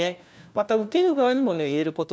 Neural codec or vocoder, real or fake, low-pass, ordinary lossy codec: codec, 16 kHz, 1 kbps, FunCodec, trained on LibriTTS, 50 frames a second; fake; none; none